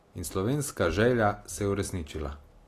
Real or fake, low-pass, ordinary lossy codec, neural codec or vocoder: real; 14.4 kHz; AAC, 48 kbps; none